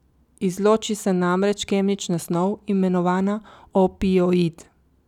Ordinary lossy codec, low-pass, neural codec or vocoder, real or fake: none; 19.8 kHz; none; real